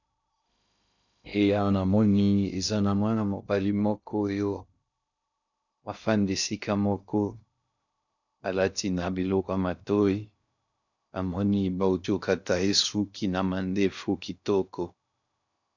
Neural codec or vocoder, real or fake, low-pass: codec, 16 kHz in and 24 kHz out, 0.6 kbps, FocalCodec, streaming, 2048 codes; fake; 7.2 kHz